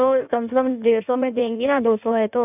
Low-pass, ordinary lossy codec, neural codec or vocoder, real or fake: 3.6 kHz; none; codec, 16 kHz in and 24 kHz out, 1.1 kbps, FireRedTTS-2 codec; fake